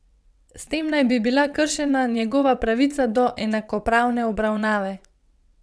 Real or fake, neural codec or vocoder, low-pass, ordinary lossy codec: fake; vocoder, 22.05 kHz, 80 mel bands, WaveNeXt; none; none